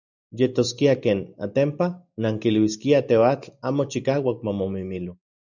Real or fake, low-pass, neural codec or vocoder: real; 7.2 kHz; none